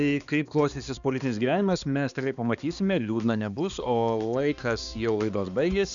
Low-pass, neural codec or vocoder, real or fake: 7.2 kHz; codec, 16 kHz, 2 kbps, X-Codec, HuBERT features, trained on balanced general audio; fake